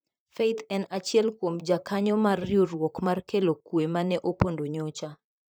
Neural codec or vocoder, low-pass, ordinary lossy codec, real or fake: vocoder, 44.1 kHz, 128 mel bands, Pupu-Vocoder; none; none; fake